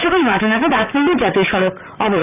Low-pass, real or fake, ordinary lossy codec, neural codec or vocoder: 3.6 kHz; fake; none; vocoder, 44.1 kHz, 128 mel bands, Pupu-Vocoder